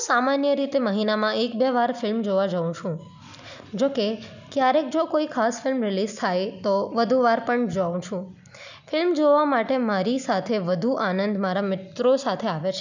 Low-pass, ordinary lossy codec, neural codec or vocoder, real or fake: 7.2 kHz; none; none; real